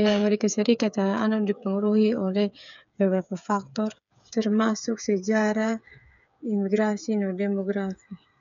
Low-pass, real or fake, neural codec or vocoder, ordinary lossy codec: 7.2 kHz; fake; codec, 16 kHz, 8 kbps, FreqCodec, smaller model; none